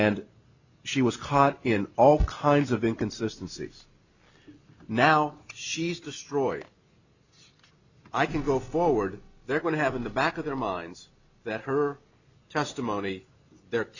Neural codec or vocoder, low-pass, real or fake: none; 7.2 kHz; real